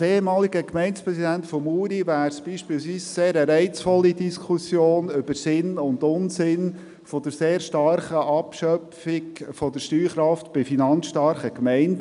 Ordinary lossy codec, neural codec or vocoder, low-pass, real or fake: none; none; 10.8 kHz; real